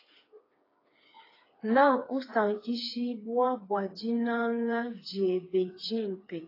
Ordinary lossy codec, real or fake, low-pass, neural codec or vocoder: AAC, 24 kbps; fake; 5.4 kHz; codec, 16 kHz, 4 kbps, FreqCodec, smaller model